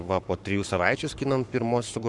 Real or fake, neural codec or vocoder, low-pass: fake; codec, 44.1 kHz, 7.8 kbps, Pupu-Codec; 10.8 kHz